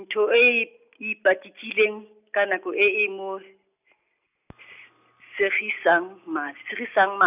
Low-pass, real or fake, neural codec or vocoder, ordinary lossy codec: 3.6 kHz; real; none; none